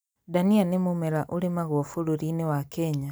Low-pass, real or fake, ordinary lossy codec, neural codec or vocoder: none; real; none; none